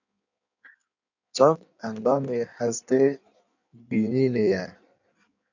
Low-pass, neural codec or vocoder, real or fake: 7.2 kHz; codec, 16 kHz in and 24 kHz out, 1.1 kbps, FireRedTTS-2 codec; fake